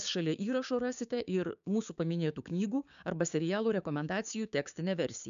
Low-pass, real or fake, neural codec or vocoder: 7.2 kHz; fake; codec, 16 kHz, 6 kbps, DAC